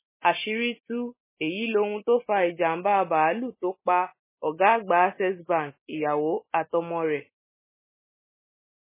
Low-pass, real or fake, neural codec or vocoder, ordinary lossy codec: 3.6 kHz; real; none; MP3, 16 kbps